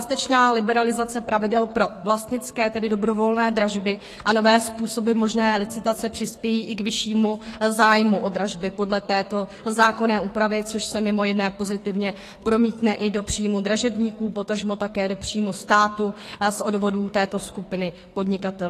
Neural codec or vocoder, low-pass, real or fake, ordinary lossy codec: codec, 44.1 kHz, 2.6 kbps, SNAC; 14.4 kHz; fake; AAC, 48 kbps